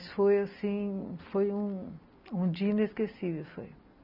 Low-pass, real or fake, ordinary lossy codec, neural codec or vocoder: 5.4 kHz; real; none; none